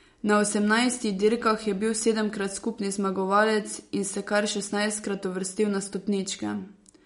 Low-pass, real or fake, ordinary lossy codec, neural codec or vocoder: 10.8 kHz; real; MP3, 48 kbps; none